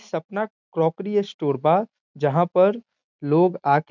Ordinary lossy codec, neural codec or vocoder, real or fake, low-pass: none; none; real; 7.2 kHz